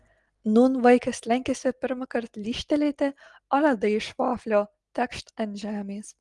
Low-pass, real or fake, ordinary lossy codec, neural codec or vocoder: 10.8 kHz; real; Opus, 24 kbps; none